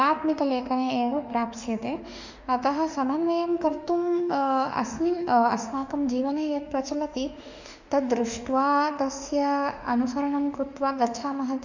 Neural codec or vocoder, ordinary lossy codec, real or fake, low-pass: autoencoder, 48 kHz, 32 numbers a frame, DAC-VAE, trained on Japanese speech; none; fake; 7.2 kHz